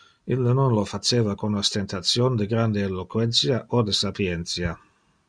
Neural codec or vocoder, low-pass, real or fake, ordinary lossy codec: none; 9.9 kHz; real; Opus, 64 kbps